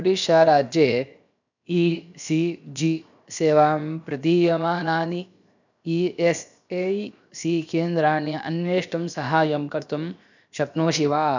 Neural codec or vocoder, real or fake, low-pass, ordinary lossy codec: codec, 16 kHz, 0.7 kbps, FocalCodec; fake; 7.2 kHz; none